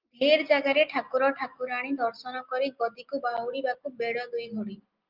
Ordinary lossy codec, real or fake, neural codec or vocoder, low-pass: Opus, 24 kbps; real; none; 5.4 kHz